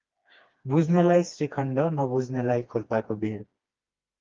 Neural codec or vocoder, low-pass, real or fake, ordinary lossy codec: codec, 16 kHz, 2 kbps, FreqCodec, smaller model; 7.2 kHz; fake; Opus, 32 kbps